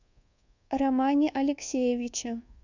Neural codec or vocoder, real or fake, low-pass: codec, 24 kHz, 1.2 kbps, DualCodec; fake; 7.2 kHz